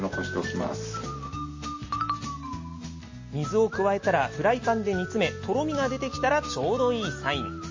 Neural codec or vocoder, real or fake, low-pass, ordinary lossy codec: none; real; 7.2 kHz; MP3, 32 kbps